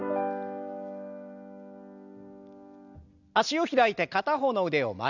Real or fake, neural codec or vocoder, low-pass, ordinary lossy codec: real; none; 7.2 kHz; none